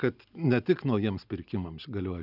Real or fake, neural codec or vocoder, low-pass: real; none; 5.4 kHz